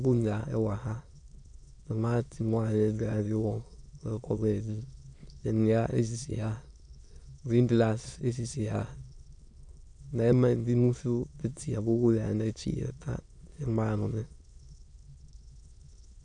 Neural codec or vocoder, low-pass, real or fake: autoencoder, 22.05 kHz, a latent of 192 numbers a frame, VITS, trained on many speakers; 9.9 kHz; fake